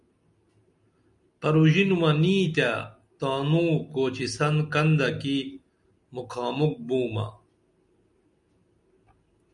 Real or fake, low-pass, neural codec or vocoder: real; 10.8 kHz; none